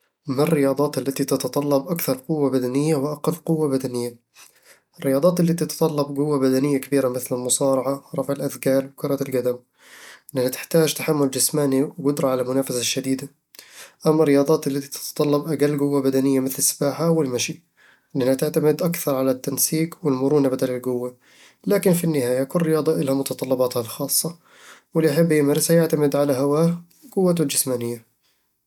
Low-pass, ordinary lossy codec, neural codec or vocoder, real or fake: 19.8 kHz; none; none; real